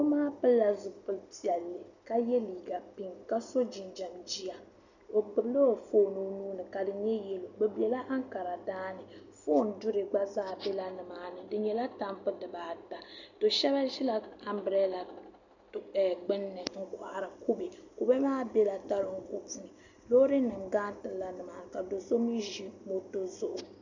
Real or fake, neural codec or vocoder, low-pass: real; none; 7.2 kHz